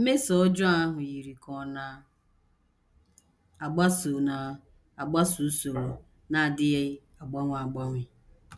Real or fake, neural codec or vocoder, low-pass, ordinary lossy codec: real; none; none; none